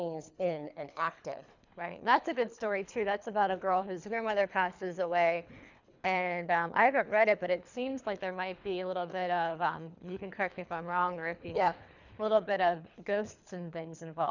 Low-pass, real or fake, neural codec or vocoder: 7.2 kHz; fake; codec, 24 kHz, 3 kbps, HILCodec